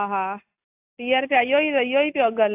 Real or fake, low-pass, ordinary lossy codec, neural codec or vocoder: real; 3.6 kHz; AAC, 32 kbps; none